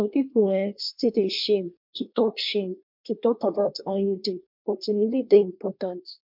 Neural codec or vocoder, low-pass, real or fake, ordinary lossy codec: codec, 24 kHz, 1 kbps, SNAC; 5.4 kHz; fake; MP3, 48 kbps